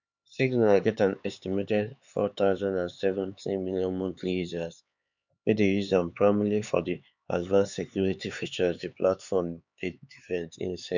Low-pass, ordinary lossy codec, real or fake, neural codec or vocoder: 7.2 kHz; none; fake; codec, 16 kHz, 4 kbps, X-Codec, HuBERT features, trained on LibriSpeech